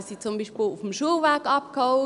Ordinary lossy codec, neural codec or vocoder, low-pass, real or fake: AAC, 96 kbps; none; 10.8 kHz; real